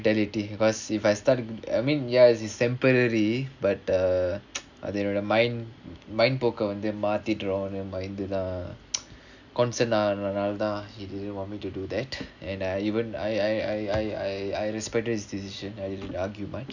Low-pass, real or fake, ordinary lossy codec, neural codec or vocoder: 7.2 kHz; real; none; none